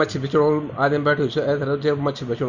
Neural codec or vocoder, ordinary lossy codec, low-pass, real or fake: none; Opus, 64 kbps; 7.2 kHz; real